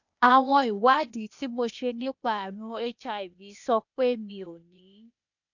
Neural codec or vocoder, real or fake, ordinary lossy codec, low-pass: codec, 16 kHz, 0.8 kbps, ZipCodec; fake; none; 7.2 kHz